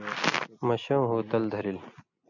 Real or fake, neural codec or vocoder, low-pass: real; none; 7.2 kHz